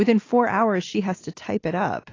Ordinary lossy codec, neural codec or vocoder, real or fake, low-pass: AAC, 32 kbps; none; real; 7.2 kHz